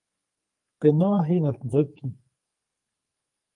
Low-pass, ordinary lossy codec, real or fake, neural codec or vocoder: 10.8 kHz; Opus, 32 kbps; fake; codec, 44.1 kHz, 2.6 kbps, SNAC